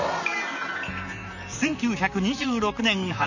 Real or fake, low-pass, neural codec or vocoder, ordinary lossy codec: fake; 7.2 kHz; vocoder, 44.1 kHz, 128 mel bands, Pupu-Vocoder; none